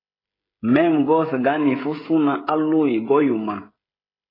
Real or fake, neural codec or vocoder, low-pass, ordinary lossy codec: fake; codec, 16 kHz, 16 kbps, FreqCodec, smaller model; 5.4 kHz; AAC, 24 kbps